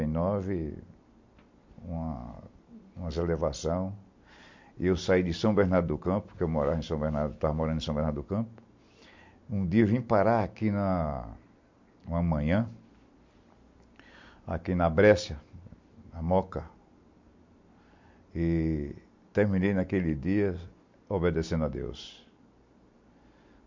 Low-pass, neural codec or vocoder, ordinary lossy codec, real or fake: 7.2 kHz; none; MP3, 48 kbps; real